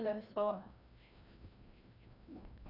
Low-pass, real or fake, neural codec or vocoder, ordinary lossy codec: 5.4 kHz; fake; codec, 16 kHz, 1 kbps, FreqCodec, larger model; none